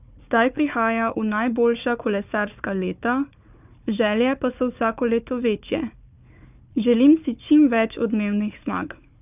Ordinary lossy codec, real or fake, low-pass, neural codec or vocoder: none; fake; 3.6 kHz; codec, 16 kHz, 4 kbps, FunCodec, trained on Chinese and English, 50 frames a second